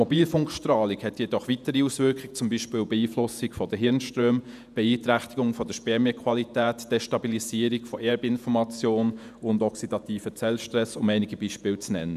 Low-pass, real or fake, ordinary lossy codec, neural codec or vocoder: 14.4 kHz; fake; none; vocoder, 48 kHz, 128 mel bands, Vocos